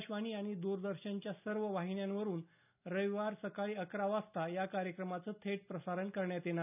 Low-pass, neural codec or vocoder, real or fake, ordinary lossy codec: 3.6 kHz; none; real; none